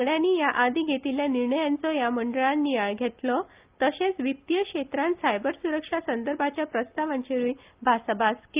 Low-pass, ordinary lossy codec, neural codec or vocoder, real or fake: 3.6 kHz; Opus, 24 kbps; none; real